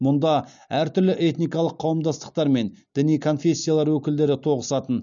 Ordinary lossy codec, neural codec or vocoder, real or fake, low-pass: none; none; real; 7.2 kHz